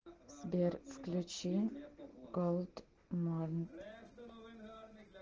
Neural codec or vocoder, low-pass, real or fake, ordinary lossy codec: none; 7.2 kHz; real; Opus, 16 kbps